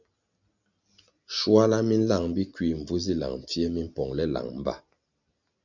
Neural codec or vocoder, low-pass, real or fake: none; 7.2 kHz; real